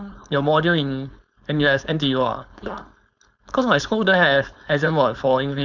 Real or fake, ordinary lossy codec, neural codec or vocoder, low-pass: fake; none; codec, 16 kHz, 4.8 kbps, FACodec; 7.2 kHz